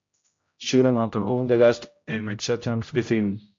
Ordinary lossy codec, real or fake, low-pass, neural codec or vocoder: MP3, 48 kbps; fake; 7.2 kHz; codec, 16 kHz, 0.5 kbps, X-Codec, HuBERT features, trained on general audio